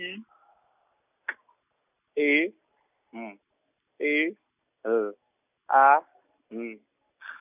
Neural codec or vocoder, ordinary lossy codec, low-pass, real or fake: none; none; 3.6 kHz; real